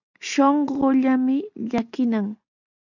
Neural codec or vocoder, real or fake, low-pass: none; real; 7.2 kHz